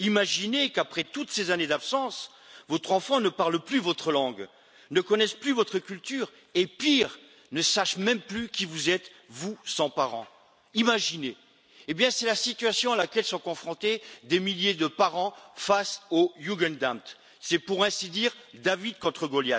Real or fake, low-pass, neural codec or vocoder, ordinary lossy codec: real; none; none; none